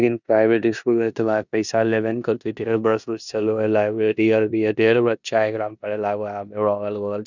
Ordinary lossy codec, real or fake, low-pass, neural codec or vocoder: none; fake; 7.2 kHz; codec, 16 kHz in and 24 kHz out, 0.9 kbps, LongCat-Audio-Codec, four codebook decoder